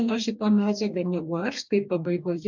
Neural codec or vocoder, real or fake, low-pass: codec, 44.1 kHz, 2.6 kbps, DAC; fake; 7.2 kHz